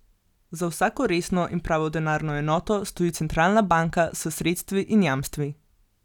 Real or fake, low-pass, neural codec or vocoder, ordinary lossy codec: real; 19.8 kHz; none; none